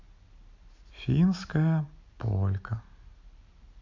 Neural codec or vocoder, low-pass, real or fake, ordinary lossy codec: none; 7.2 kHz; real; MP3, 48 kbps